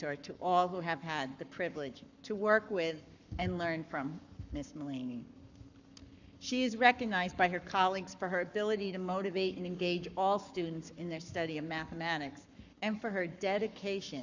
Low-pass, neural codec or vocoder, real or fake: 7.2 kHz; codec, 44.1 kHz, 7.8 kbps, Pupu-Codec; fake